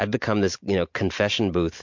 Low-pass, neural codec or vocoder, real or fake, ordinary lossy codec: 7.2 kHz; none; real; MP3, 48 kbps